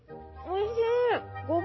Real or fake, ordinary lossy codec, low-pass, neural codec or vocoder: real; MP3, 24 kbps; 7.2 kHz; none